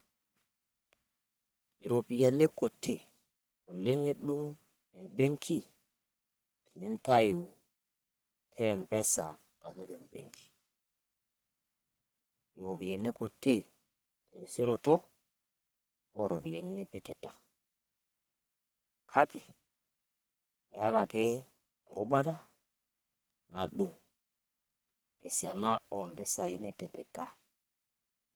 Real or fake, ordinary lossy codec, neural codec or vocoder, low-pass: fake; none; codec, 44.1 kHz, 1.7 kbps, Pupu-Codec; none